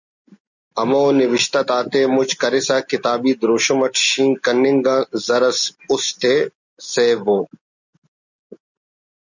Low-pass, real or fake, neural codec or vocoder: 7.2 kHz; real; none